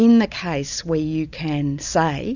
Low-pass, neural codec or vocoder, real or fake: 7.2 kHz; none; real